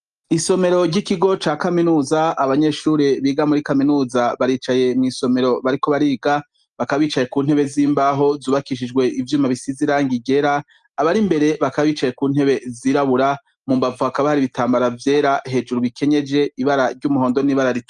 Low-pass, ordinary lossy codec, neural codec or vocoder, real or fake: 10.8 kHz; Opus, 32 kbps; none; real